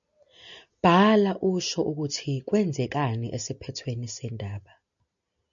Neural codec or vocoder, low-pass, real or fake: none; 7.2 kHz; real